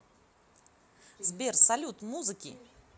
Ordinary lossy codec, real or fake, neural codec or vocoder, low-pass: none; real; none; none